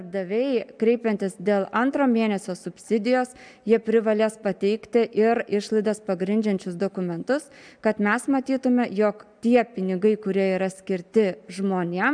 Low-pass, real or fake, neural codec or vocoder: 9.9 kHz; real; none